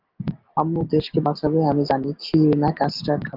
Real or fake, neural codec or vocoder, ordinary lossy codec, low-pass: real; none; Opus, 24 kbps; 5.4 kHz